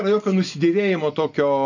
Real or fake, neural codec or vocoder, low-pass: real; none; 7.2 kHz